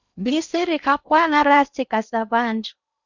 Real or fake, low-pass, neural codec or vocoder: fake; 7.2 kHz; codec, 16 kHz in and 24 kHz out, 0.6 kbps, FocalCodec, streaming, 2048 codes